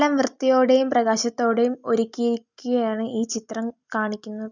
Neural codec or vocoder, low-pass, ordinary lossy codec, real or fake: none; 7.2 kHz; none; real